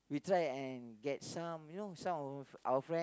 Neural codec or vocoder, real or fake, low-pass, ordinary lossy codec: none; real; none; none